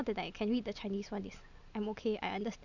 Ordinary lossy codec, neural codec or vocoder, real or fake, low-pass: none; none; real; 7.2 kHz